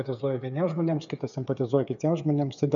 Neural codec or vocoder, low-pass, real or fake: codec, 16 kHz, 8 kbps, FreqCodec, smaller model; 7.2 kHz; fake